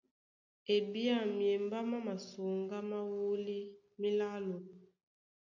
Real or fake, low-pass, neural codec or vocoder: real; 7.2 kHz; none